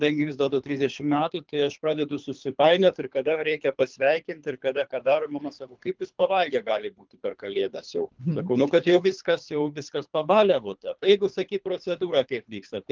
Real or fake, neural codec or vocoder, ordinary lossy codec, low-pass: fake; codec, 24 kHz, 3 kbps, HILCodec; Opus, 32 kbps; 7.2 kHz